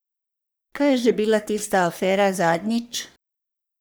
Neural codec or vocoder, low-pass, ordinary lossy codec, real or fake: codec, 44.1 kHz, 3.4 kbps, Pupu-Codec; none; none; fake